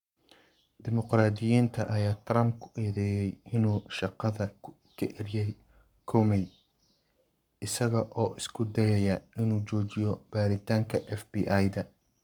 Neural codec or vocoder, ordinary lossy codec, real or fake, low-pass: codec, 44.1 kHz, 7.8 kbps, Pupu-Codec; none; fake; 19.8 kHz